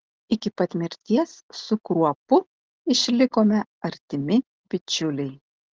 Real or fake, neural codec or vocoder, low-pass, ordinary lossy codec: real; none; 7.2 kHz; Opus, 16 kbps